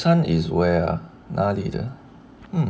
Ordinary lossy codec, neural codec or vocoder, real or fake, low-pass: none; none; real; none